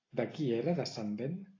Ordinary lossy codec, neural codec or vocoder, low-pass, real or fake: AAC, 32 kbps; none; 7.2 kHz; real